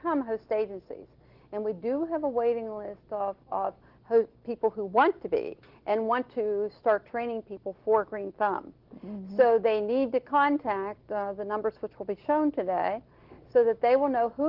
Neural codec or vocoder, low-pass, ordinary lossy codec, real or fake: none; 5.4 kHz; Opus, 16 kbps; real